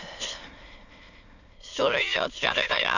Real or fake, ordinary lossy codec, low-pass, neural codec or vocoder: fake; none; 7.2 kHz; autoencoder, 22.05 kHz, a latent of 192 numbers a frame, VITS, trained on many speakers